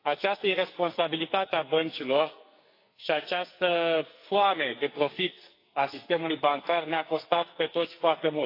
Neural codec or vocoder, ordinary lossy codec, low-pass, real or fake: codec, 44.1 kHz, 2.6 kbps, SNAC; AAC, 32 kbps; 5.4 kHz; fake